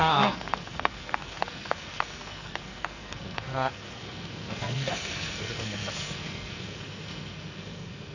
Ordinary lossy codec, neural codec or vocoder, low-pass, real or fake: none; codec, 44.1 kHz, 2.6 kbps, SNAC; 7.2 kHz; fake